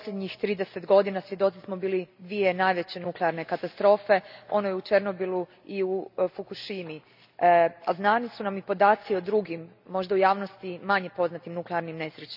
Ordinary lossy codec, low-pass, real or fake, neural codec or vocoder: none; 5.4 kHz; real; none